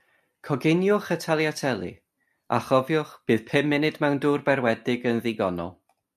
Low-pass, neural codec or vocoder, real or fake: 14.4 kHz; none; real